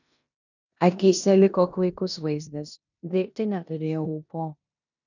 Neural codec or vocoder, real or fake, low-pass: codec, 16 kHz in and 24 kHz out, 0.9 kbps, LongCat-Audio-Codec, four codebook decoder; fake; 7.2 kHz